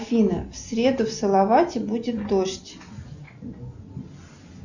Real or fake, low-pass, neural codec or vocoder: real; 7.2 kHz; none